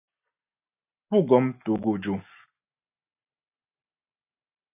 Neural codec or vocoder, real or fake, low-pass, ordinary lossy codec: none; real; 3.6 kHz; AAC, 24 kbps